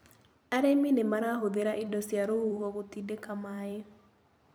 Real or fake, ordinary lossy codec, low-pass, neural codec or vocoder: fake; none; none; vocoder, 44.1 kHz, 128 mel bands every 256 samples, BigVGAN v2